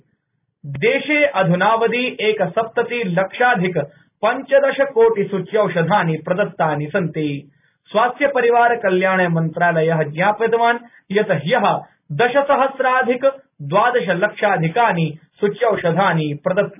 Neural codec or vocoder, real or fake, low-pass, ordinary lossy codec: none; real; 3.6 kHz; none